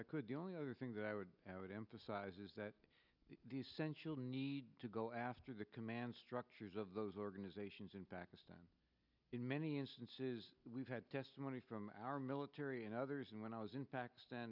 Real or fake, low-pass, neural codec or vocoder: real; 5.4 kHz; none